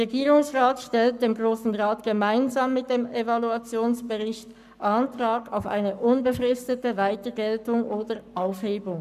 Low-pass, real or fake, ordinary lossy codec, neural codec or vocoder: 14.4 kHz; fake; none; codec, 44.1 kHz, 7.8 kbps, Pupu-Codec